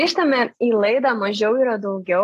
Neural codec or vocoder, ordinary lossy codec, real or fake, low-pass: none; AAC, 48 kbps; real; 14.4 kHz